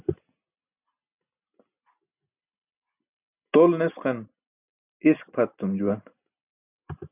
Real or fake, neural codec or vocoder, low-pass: real; none; 3.6 kHz